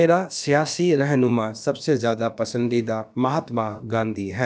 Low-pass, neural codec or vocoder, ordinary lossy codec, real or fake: none; codec, 16 kHz, about 1 kbps, DyCAST, with the encoder's durations; none; fake